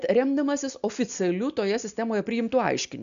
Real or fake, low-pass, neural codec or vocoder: real; 7.2 kHz; none